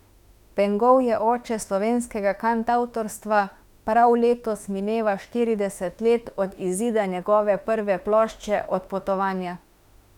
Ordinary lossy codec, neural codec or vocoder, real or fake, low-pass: none; autoencoder, 48 kHz, 32 numbers a frame, DAC-VAE, trained on Japanese speech; fake; 19.8 kHz